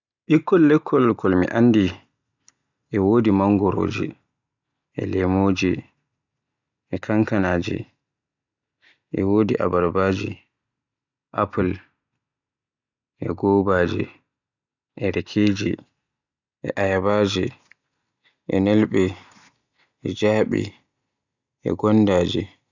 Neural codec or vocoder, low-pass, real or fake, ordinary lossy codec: none; 7.2 kHz; real; none